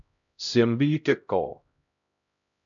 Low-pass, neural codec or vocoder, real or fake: 7.2 kHz; codec, 16 kHz, 0.5 kbps, X-Codec, HuBERT features, trained on LibriSpeech; fake